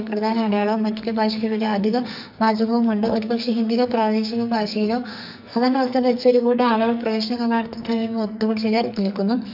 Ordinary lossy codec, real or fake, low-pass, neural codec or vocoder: none; fake; 5.4 kHz; codec, 44.1 kHz, 2.6 kbps, SNAC